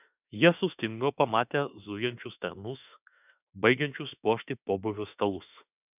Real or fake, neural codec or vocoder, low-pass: fake; autoencoder, 48 kHz, 32 numbers a frame, DAC-VAE, trained on Japanese speech; 3.6 kHz